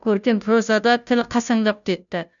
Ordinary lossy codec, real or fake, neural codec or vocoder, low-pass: none; fake; codec, 16 kHz, 0.5 kbps, FunCodec, trained on Chinese and English, 25 frames a second; 7.2 kHz